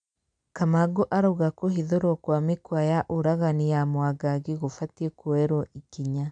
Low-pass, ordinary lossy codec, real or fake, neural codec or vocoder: 9.9 kHz; none; real; none